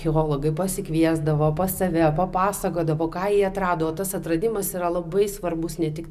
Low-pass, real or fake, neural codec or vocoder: 14.4 kHz; real; none